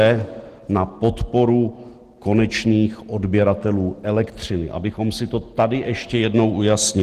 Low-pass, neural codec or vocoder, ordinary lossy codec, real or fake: 14.4 kHz; none; Opus, 16 kbps; real